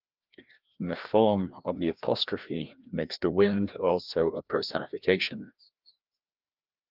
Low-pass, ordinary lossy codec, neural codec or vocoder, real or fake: 5.4 kHz; Opus, 32 kbps; codec, 16 kHz, 1 kbps, FreqCodec, larger model; fake